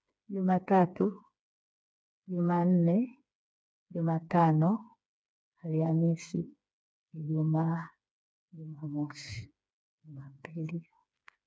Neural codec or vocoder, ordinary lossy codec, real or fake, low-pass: codec, 16 kHz, 4 kbps, FreqCodec, smaller model; none; fake; none